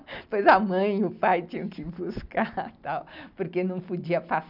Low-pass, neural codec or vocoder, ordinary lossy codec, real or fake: 5.4 kHz; none; none; real